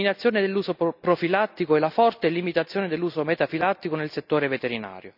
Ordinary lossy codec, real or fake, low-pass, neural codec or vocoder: none; real; 5.4 kHz; none